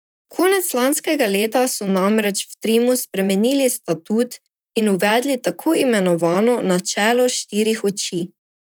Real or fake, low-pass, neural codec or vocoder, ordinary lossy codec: fake; none; vocoder, 44.1 kHz, 128 mel bands, Pupu-Vocoder; none